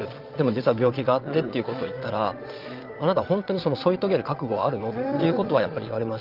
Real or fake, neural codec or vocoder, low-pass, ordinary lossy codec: fake; vocoder, 22.05 kHz, 80 mel bands, Vocos; 5.4 kHz; Opus, 32 kbps